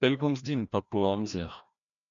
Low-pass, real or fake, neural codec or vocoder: 7.2 kHz; fake; codec, 16 kHz, 1 kbps, FreqCodec, larger model